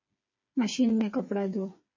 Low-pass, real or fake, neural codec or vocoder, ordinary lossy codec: 7.2 kHz; fake; codec, 16 kHz, 4 kbps, FreqCodec, smaller model; MP3, 32 kbps